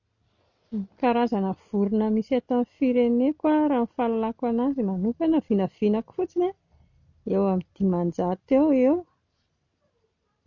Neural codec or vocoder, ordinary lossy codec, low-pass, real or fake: none; none; 7.2 kHz; real